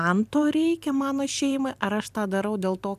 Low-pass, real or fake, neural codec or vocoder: 14.4 kHz; fake; vocoder, 44.1 kHz, 128 mel bands every 512 samples, BigVGAN v2